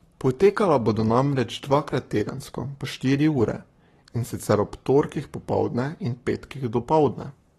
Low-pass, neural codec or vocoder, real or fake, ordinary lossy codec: 19.8 kHz; codec, 44.1 kHz, 7.8 kbps, Pupu-Codec; fake; AAC, 32 kbps